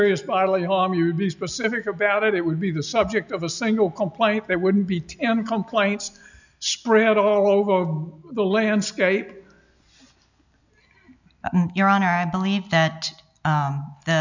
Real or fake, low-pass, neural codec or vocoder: real; 7.2 kHz; none